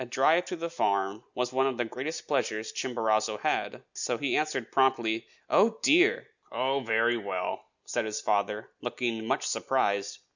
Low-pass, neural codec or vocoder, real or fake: 7.2 kHz; none; real